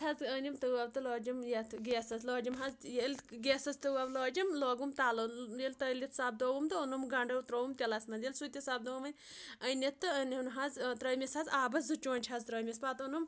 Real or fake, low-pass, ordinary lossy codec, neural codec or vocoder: real; none; none; none